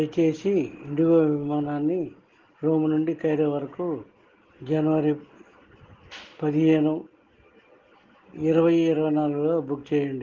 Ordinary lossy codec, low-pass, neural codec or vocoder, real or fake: Opus, 16 kbps; 7.2 kHz; none; real